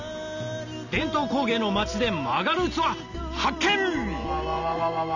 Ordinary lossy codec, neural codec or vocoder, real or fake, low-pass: none; none; real; 7.2 kHz